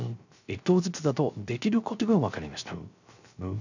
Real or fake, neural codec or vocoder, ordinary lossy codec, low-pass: fake; codec, 16 kHz, 0.3 kbps, FocalCodec; none; 7.2 kHz